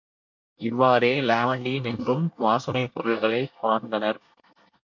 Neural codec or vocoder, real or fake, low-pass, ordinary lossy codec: codec, 24 kHz, 1 kbps, SNAC; fake; 7.2 kHz; MP3, 48 kbps